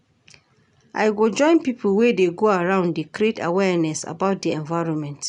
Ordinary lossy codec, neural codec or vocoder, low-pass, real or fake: none; none; 10.8 kHz; real